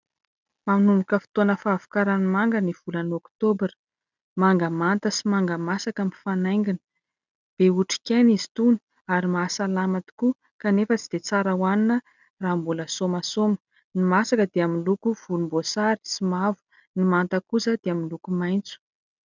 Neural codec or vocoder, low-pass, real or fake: none; 7.2 kHz; real